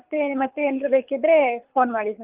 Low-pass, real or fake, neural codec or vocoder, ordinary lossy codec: 3.6 kHz; fake; codec, 24 kHz, 6 kbps, HILCodec; Opus, 32 kbps